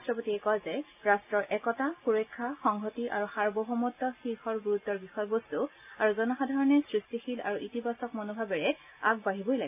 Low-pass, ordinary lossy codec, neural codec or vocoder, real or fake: 3.6 kHz; AAC, 32 kbps; none; real